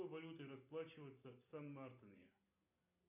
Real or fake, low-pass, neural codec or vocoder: real; 3.6 kHz; none